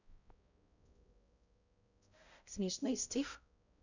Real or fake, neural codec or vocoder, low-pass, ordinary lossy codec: fake; codec, 16 kHz, 0.5 kbps, X-Codec, HuBERT features, trained on balanced general audio; 7.2 kHz; none